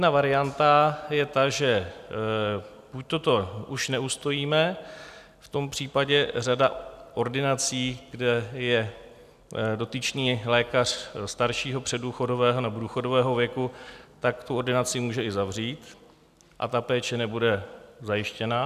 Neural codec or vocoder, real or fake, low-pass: none; real; 14.4 kHz